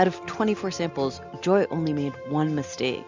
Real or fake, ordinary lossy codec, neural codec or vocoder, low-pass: real; MP3, 64 kbps; none; 7.2 kHz